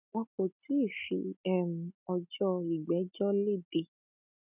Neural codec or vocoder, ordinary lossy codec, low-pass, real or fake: none; none; 3.6 kHz; real